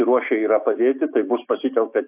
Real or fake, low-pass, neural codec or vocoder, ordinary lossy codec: fake; 3.6 kHz; autoencoder, 48 kHz, 128 numbers a frame, DAC-VAE, trained on Japanese speech; AAC, 32 kbps